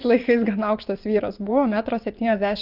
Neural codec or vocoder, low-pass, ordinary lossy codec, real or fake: vocoder, 24 kHz, 100 mel bands, Vocos; 5.4 kHz; Opus, 24 kbps; fake